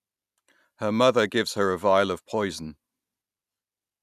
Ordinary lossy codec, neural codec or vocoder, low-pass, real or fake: none; none; 14.4 kHz; real